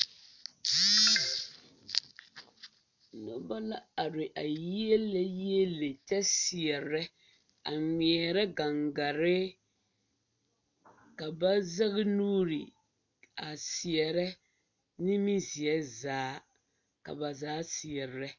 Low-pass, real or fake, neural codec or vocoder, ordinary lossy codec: 7.2 kHz; real; none; MP3, 64 kbps